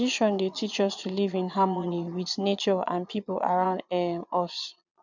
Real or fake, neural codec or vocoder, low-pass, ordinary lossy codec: fake; vocoder, 22.05 kHz, 80 mel bands, Vocos; 7.2 kHz; none